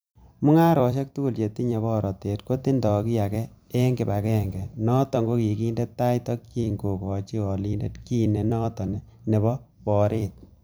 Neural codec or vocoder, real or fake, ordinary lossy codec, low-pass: vocoder, 44.1 kHz, 128 mel bands every 256 samples, BigVGAN v2; fake; none; none